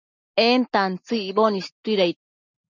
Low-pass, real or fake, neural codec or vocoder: 7.2 kHz; real; none